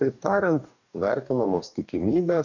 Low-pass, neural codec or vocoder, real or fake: 7.2 kHz; codec, 44.1 kHz, 2.6 kbps, DAC; fake